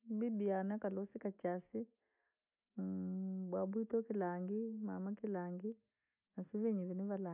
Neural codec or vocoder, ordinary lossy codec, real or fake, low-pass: none; none; real; 3.6 kHz